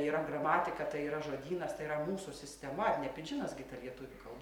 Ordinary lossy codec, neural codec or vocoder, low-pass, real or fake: MP3, 96 kbps; none; 19.8 kHz; real